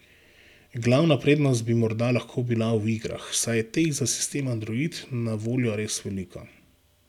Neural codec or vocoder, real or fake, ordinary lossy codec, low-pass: none; real; none; 19.8 kHz